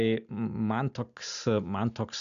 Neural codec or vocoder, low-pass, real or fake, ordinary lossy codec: none; 7.2 kHz; real; AAC, 96 kbps